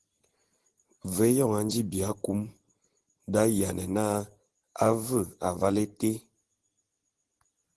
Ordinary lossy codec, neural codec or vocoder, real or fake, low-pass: Opus, 16 kbps; none; real; 10.8 kHz